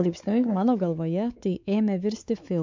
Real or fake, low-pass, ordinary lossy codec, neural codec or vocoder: fake; 7.2 kHz; MP3, 64 kbps; codec, 16 kHz, 4 kbps, X-Codec, WavLM features, trained on Multilingual LibriSpeech